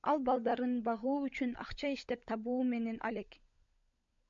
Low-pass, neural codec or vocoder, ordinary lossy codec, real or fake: 7.2 kHz; codec, 16 kHz, 16 kbps, FunCodec, trained on LibriTTS, 50 frames a second; MP3, 64 kbps; fake